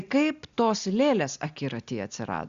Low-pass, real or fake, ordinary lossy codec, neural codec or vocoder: 7.2 kHz; real; Opus, 64 kbps; none